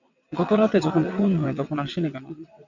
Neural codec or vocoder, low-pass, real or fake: vocoder, 22.05 kHz, 80 mel bands, WaveNeXt; 7.2 kHz; fake